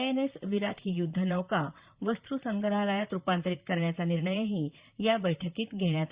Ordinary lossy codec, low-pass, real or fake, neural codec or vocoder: Opus, 64 kbps; 3.6 kHz; fake; vocoder, 44.1 kHz, 128 mel bands, Pupu-Vocoder